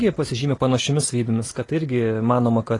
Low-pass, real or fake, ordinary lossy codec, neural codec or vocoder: 10.8 kHz; real; AAC, 32 kbps; none